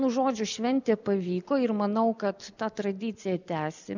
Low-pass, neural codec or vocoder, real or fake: 7.2 kHz; none; real